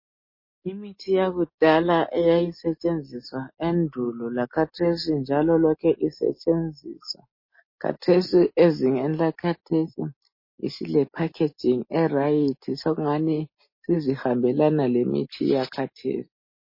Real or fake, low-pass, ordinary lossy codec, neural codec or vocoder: real; 5.4 kHz; MP3, 24 kbps; none